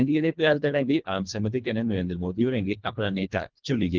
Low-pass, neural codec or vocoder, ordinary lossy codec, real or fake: 7.2 kHz; codec, 24 kHz, 1.5 kbps, HILCodec; Opus, 32 kbps; fake